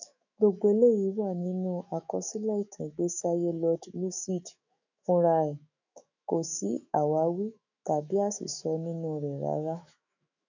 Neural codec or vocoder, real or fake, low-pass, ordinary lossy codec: autoencoder, 48 kHz, 128 numbers a frame, DAC-VAE, trained on Japanese speech; fake; 7.2 kHz; none